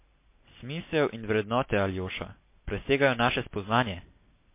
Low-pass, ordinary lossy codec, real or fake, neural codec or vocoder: 3.6 kHz; MP3, 24 kbps; real; none